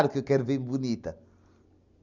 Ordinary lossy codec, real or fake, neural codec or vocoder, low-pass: none; real; none; 7.2 kHz